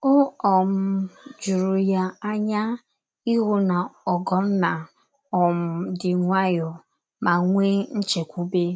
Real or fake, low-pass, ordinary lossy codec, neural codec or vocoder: real; none; none; none